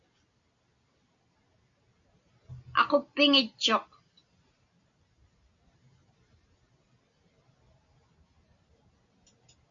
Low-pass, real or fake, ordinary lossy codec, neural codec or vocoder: 7.2 kHz; real; MP3, 64 kbps; none